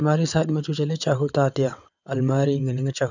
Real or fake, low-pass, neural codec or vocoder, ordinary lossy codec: fake; 7.2 kHz; vocoder, 22.05 kHz, 80 mel bands, WaveNeXt; none